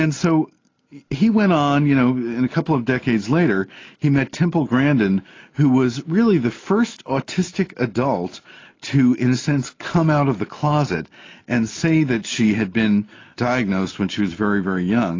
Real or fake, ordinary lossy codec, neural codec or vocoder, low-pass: real; AAC, 32 kbps; none; 7.2 kHz